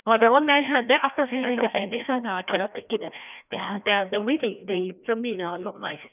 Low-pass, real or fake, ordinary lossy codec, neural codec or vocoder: 3.6 kHz; fake; none; codec, 16 kHz, 1 kbps, FreqCodec, larger model